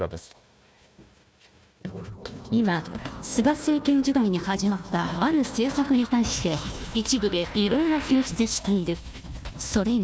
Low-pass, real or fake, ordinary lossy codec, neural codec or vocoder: none; fake; none; codec, 16 kHz, 1 kbps, FunCodec, trained on Chinese and English, 50 frames a second